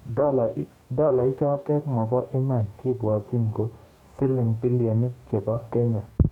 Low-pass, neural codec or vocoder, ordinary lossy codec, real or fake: 19.8 kHz; codec, 44.1 kHz, 2.6 kbps, DAC; none; fake